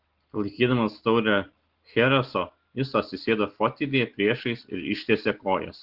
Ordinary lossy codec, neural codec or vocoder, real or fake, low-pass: Opus, 16 kbps; none; real; 5.4 kHz